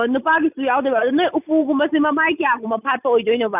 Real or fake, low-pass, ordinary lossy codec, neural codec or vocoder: real; 3.6 kHz; none; none